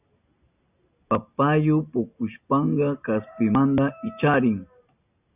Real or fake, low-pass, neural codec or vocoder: real; 3.6 kHz; none